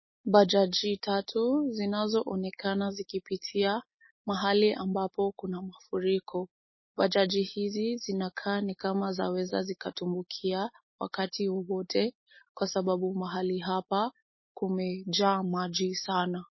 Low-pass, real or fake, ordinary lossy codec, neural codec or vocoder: 7.2 kHz; real; MP3, 24 kbps; none